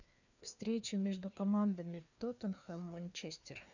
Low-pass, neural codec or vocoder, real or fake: 7.2 kHz; codec, 16 kHz, 2 kbps, FreqCodec, larger model; fake